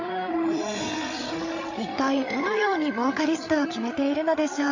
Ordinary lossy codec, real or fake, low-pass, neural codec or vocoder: AAC, 48 kbps; fake; 7.2 kHz; codec, 16 kHz, 8 kbps, FreqCodec, larger model